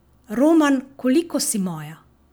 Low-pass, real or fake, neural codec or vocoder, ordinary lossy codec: none; real; none; none